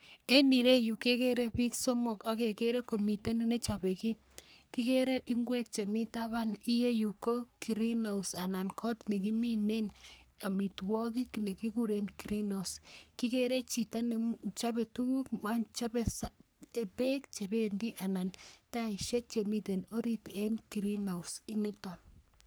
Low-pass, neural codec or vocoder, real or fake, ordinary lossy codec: none; codec, 44.1 kHz, 3.4 kbps, Pupu-Codec; fake; none